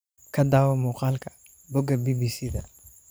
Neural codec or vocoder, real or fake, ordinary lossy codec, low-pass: vocoder, 44.1 kHz, 128 mel bands every 512 samples, BigVGAN v2; fake; none; none